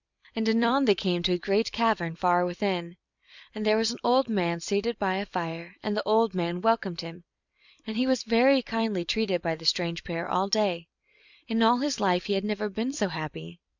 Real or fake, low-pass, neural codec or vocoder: fake; 7.2 kHz; vocoder, 44.1 kHz, 128 mel bands every 256 samples, BigVGAN v2